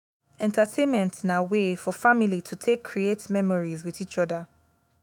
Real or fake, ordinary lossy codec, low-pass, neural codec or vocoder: fake; none; 19.8 kHz; autoencoder, 48 kHz, 128 numbers a frame, DAC-VAE, trained on Japanese speech